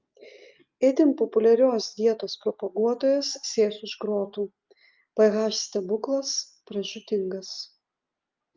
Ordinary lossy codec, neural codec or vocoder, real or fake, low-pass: Opus, 24 kbps; none; real; 7.2 kHz